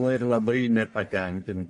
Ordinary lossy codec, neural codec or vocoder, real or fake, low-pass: MP3, 48 kbps; codec, 44.1 kHz, 1.7 kbps, Pupu-Codec; fake; 10.8 kHz